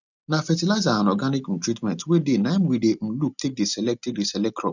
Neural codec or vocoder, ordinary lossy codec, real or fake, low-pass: none; none; real; 7.2 kHz